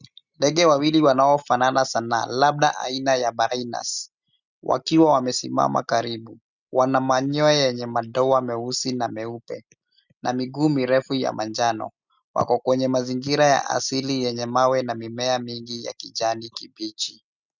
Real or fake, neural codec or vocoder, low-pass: real; none; 7.2 kHz